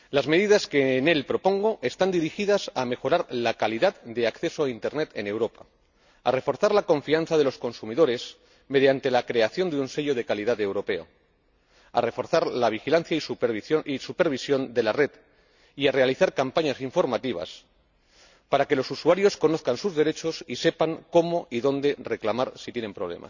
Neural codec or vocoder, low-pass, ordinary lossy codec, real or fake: none; 7.2 kHz; none; real